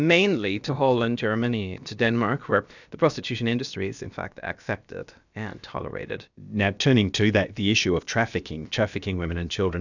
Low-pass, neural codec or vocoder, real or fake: 7.2 kHz; codec, 16 kHz, about 1 kbps, DyCAST, with the encoder's durations; fake